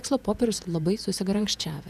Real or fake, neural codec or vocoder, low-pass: fake; vocoder, 48 kHz, 128 mel bands, Vocos; 14.4 kHz